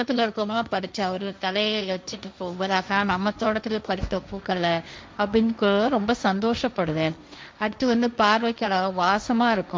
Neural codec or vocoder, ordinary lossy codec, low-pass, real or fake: codec, 16 kHz, 1.1 kbps, Voila-Tokenizer; none; none; fake